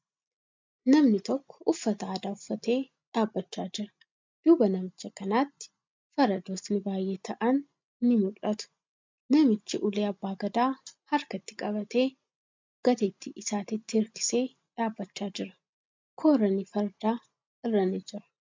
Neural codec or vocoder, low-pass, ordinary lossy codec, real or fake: none; 7.2 kHz; MP3, 64 kbps; real